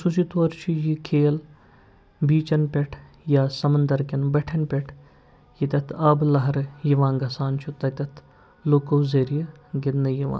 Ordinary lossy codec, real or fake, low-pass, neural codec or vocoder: none; real; none; none